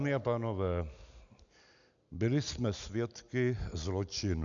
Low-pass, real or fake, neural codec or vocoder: 7.2 kHz; real; none